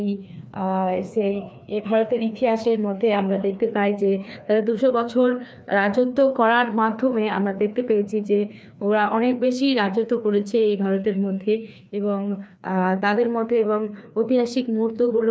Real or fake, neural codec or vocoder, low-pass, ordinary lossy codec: fake; codec, 16 kHz, 2 kbps, FreqCodec, larger model; none; none